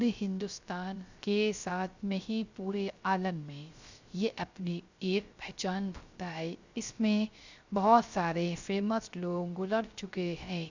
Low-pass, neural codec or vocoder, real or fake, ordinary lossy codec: 7.2 kHz; codec, 16 kHz, 0.3 kbps, FocalCodec; fake; Opus, 64 kbps